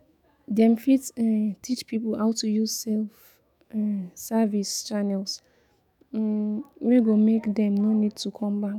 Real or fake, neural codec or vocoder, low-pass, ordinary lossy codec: fake; autoencoder, 48 kHz, 128 numbers a frame, DAC-VAE, trained on Japanese speech; 19.8 kHz; none